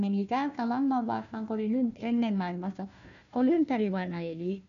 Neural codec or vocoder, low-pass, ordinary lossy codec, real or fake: codec, 16 kHz, 1 kbps, FunCodec, trained on Chinese and English, 50 frames a second; 7.2 kHz; none; fake